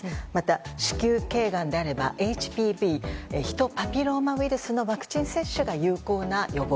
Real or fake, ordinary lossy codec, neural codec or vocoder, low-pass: real; none; none; none